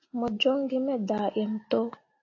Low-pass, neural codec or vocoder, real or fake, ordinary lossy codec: 7.2 kHz; none; real; AAC, 32 kbps